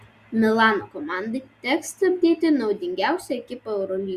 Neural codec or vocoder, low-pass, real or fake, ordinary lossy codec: none; 14.4 kHz; real; Opus, 64 kbps